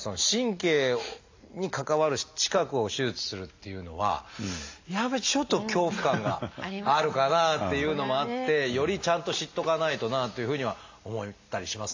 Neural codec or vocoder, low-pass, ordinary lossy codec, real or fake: none; 7.2 kHz; AAC, 48 kbps; real